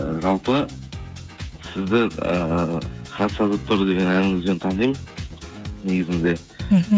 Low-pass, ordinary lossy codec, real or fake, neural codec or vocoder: none; none; fake; codec, 16 kHz, 16 kbps, FreqCodec, smaller model